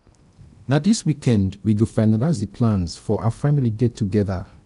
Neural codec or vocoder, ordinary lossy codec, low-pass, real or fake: codec, 16 kHz in and 24 kHz out, 0.8 kbps, FocalCodec, streaming, 65536 codes; none; 10.8 kHz; fake